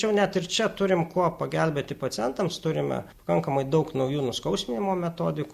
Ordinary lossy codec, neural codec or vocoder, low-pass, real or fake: Opus, 64 kbps; none; 14.4 kHz; real